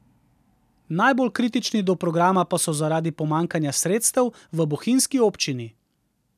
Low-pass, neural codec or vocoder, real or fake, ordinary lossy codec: 14.4 kHz; none; real; none